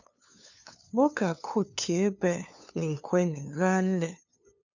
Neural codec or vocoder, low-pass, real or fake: codec, 16 kHz, 2 kbps, FunCodec, trained on LibriTTS, 25 frames a second; 7.2 kHz; fake